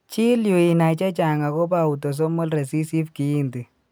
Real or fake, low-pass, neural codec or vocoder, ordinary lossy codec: real; none; none; none